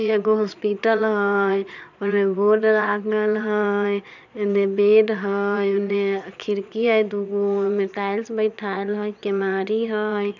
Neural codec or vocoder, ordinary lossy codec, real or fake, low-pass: vocoder, 22.05 kHz, 80 mel bands, Vocos; none; fake; 7.2 kHz